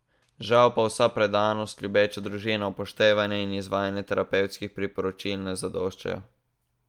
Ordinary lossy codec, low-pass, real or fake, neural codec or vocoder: Opus, 32 kbps; 19.8 kHz; real; none